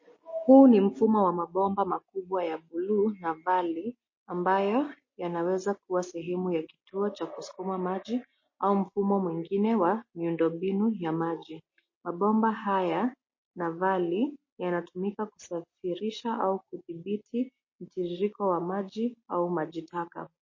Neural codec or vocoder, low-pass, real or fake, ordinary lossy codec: none; 7.2 kHz; real; MP3, 32 kbps